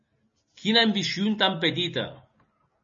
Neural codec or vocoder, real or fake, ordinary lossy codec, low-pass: none; real; MP3, 32 kbps; 7.2 kHz